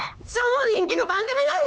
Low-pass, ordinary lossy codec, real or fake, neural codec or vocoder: none; none; fake; codec, 16 kHz, 2 kbps, X-Codec, HuBERT features, trained on LibriSpeech